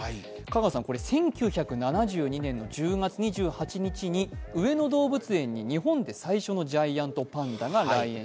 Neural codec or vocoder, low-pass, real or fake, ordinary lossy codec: none; none; real; none